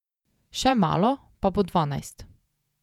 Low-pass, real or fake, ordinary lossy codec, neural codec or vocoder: 19.8 kHz; fake; none; vocoder, 48 kHz, 128 mel bands, Vocos